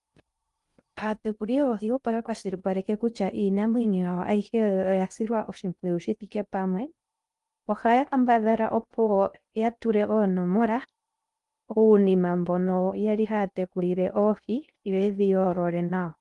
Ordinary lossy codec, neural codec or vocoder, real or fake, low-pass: Opus, 32 kbps; codec, 16 kHz in and 24 kHz out, 0.6 kbps, FocalCodec, streaming, 2048 codes; fake; 10.8 kHz